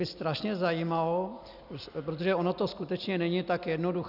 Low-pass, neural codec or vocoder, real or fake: 5.4 kHz; none; real